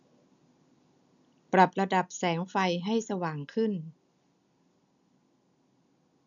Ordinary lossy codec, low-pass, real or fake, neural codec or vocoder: none; 7.2 kHz; real; none